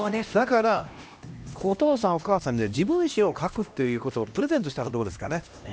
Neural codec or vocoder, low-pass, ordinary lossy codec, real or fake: codec, 16 kHz, 1 kbps, X-Codec, HuBERT features, trained on LibriSpeech; none; none; fake